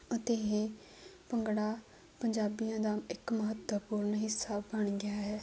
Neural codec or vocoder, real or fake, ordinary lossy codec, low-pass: none; real; none; none